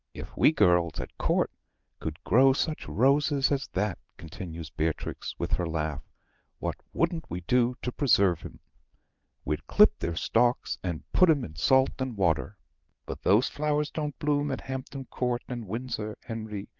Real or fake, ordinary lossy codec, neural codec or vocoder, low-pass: real; Opus, 24 kbps; none; 7.2 kHz